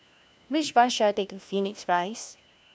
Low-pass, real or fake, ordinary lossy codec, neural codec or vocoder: none; fake; none; codec, 16 kHz, 1 kbps, FunCodec, trained on LibriTTS, 50 frames a second